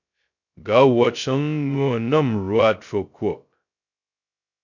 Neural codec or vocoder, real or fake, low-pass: codec, 16 kHz, 0.2 kbps, FocalCodec; fake; 7.2 kHz